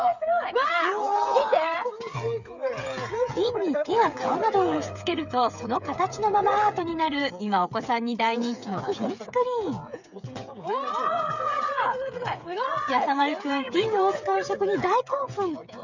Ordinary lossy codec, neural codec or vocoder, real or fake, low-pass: none; codec, 16 kHz, 8 kbps, FreqCodec, smaller model; fake; 7.2 kHz